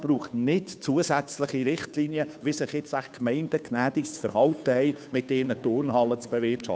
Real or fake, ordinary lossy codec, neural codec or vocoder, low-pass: fake; none; codec, 16 kHz, 2 kbps, FunCodec, trained on Chinese and English, 25 frames a second; none